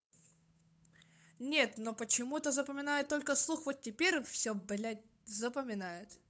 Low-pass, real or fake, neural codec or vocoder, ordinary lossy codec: none; fake; codec, 16 kHz, 8 kbps, FunCodec, trained on Chinese and English, 25 frames a second; none